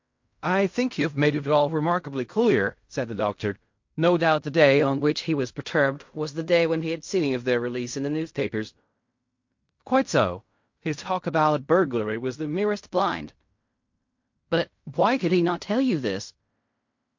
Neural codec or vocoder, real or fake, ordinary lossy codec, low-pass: codec, 16 kHz in and 24 kHz out, 0.4 kbps, LongCat-Audio-Codec, fine tuned four codebook decoder; fake; MP3, 48 kbps; 7.2 kHz